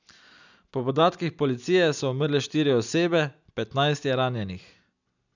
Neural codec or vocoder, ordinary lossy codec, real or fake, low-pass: none; none; real; 7.2 kHz